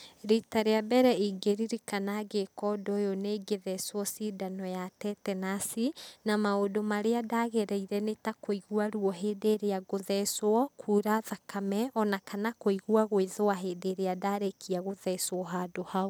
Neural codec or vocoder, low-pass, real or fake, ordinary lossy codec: none; none; real; none